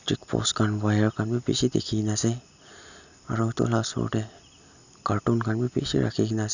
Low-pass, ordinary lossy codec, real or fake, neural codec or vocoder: 7.2 kHz; none; real; none